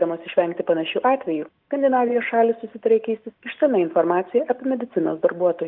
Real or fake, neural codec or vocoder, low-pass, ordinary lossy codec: real; none; 5.4 kHz; Opus, 24 kbps